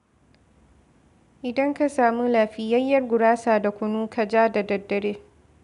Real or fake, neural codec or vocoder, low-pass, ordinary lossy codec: real; none; 10.8 kHz; none